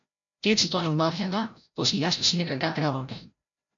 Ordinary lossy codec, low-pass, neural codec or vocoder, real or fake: MP3, 64 kbps; 7.2 kHz; codec, 16 kHz, 0.5 kbps, FreqCodec, larger model; fake